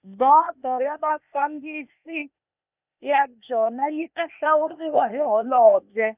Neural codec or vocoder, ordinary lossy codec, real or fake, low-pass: codec, 16 kHz, 0.8 kbps, ZipCodec; none; fake; 3.6 kHz